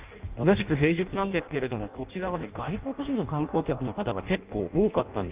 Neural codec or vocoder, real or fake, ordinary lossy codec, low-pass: codec, 16 kHz in and 24 kHz out, 0.6 kbps, FireRedTTS-2 codec; fake; Opus, 24 kbps; 3.6 kHz